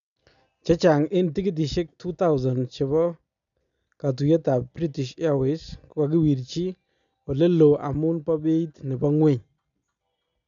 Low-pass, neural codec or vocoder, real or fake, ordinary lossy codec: 7.2 kHz; none; real; none